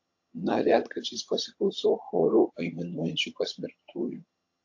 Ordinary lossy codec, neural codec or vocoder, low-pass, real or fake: AAC, 48 kbps; vocoder, 22.05 kHz, 80 mel bands, HiFi-GAN; 7.2 kHz; fake